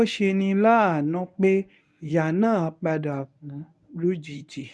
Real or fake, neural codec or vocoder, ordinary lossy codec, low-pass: fake; codec, 24 kHz, 0.9 kbps, WavTokenizer, medium speech release version 1; none; none